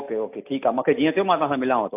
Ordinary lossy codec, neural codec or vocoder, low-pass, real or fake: AAC, 32 kbps; none; 3.6 kHz; real